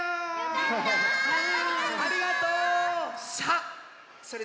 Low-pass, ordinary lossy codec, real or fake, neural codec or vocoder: none; none; real; none